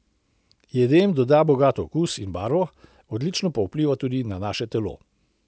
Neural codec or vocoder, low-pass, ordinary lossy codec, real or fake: none; none; none; real